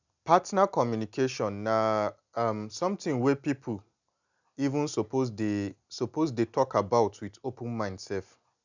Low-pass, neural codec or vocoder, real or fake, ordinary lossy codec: 7.2 kHz; none; real; none